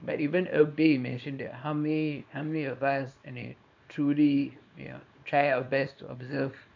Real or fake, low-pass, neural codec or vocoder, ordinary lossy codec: fake; 7.2 kHz; codec, 24 kHz, 0.9 kbps, WavTokenizer, small release; MP3, 64 kbps